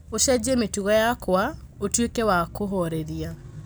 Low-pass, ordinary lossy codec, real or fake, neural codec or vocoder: none; none; real; none